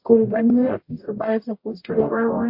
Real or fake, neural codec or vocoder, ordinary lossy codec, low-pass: fake; codec, 44.1 kHz, 0.9 kbps, DAC; MP3, 32 kbps; 5.4 kHz